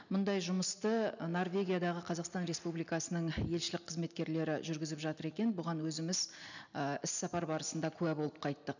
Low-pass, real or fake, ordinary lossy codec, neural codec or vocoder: 7.2 kHz; real; none; none